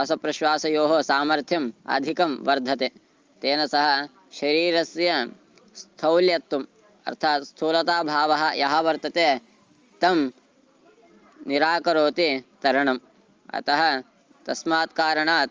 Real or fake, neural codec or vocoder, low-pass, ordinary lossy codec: real; none; 7.2 kHz; Opus, 32 kbps